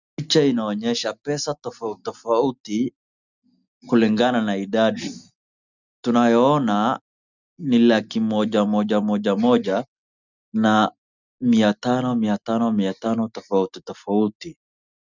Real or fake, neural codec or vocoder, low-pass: real; none; 7.2 kHz